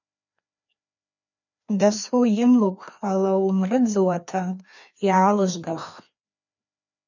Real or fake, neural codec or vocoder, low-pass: fake; codec, 16 kHz, 2 kbps, FreqCodec, larger model; 7.2 kHz